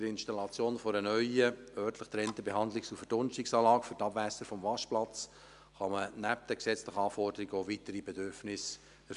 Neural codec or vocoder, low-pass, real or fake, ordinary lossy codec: none; 10.8 kHz; real; MP3, 96 kbps